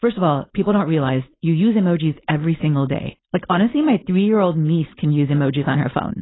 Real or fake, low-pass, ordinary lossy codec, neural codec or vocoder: fake; 7.2 kHz; AAC, 16 kbps; codec, 16 kHz, 4.8 kbps, FACodec